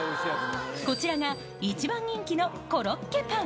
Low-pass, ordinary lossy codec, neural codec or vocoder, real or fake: none; none; none; real